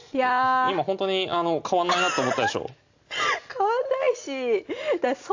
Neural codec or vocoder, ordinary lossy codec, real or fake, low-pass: none; AAC, 48 kbps; real; 7.2 kHz